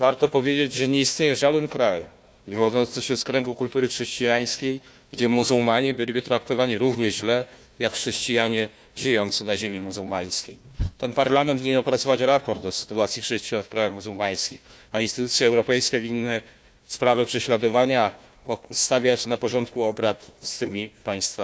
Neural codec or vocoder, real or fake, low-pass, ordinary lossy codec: codec, 16 kHz, 1 kbps, FunCodec, trained on Chinese and English, 50 frames a second; fake; none; none